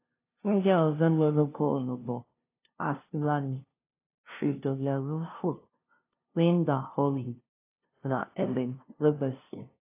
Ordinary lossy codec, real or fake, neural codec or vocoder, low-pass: AAC, 24 kbps; fake; codec, 16 kHz, 0.5 kbps, FunCodec, trained on LibriTTS, 25 frames a second; 3.6 kHz